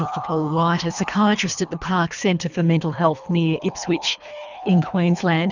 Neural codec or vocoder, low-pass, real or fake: codec, 24 kHz, 3 kbps, HILCodec; 7.2 kHz; fake